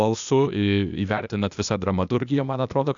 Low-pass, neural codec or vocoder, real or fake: 7.2 kHz; codec, 16 kHz, 0.8 kbps, ZipCodec; fake